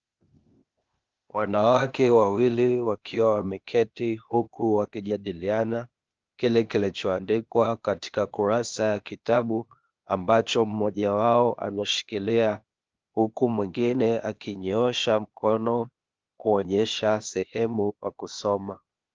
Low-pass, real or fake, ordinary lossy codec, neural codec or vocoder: 7.2 kHz; fake; Opus, 24 kbps; codec, 16 kHz, 0.8 kbps, ZipCodec